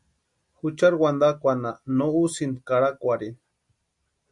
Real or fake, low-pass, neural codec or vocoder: real; 10.8 kHz; none